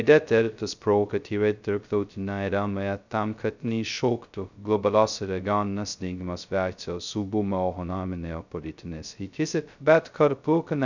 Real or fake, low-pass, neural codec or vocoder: fake; 7.2 kHz; codec, 16 kHz, 0.2 kbps, FocalCodec